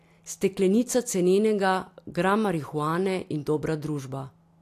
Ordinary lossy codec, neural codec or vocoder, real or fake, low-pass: AAC, 64 kbps; none; real; 14.4 kHz